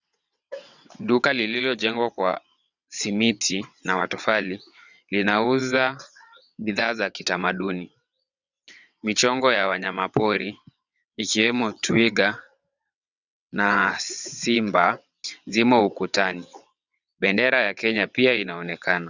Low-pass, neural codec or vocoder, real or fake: 7.2 kHz; vocoder, 22.05 kHz, 80 mel bands, WaveNeXt; fake